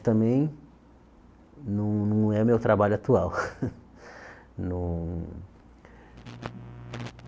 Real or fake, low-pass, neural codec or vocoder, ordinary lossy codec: real; none; none; none